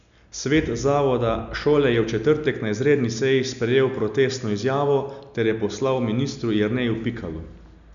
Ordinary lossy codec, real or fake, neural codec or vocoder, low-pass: none; real; none; 7.2 kHz